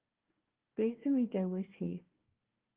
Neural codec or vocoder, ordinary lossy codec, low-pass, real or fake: codec, 16 kHz, 1 kbps, FunCodec, trained on Chinese and English, 50 frames a second; Opus, 16 kbps; 3.6 kHz; fake